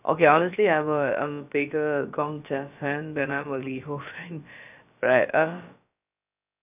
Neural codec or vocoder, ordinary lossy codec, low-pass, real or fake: codec, 16 kHz, about 1 kbps, DyCAST, with the encoder's durations; none; 3.6 kHz; fake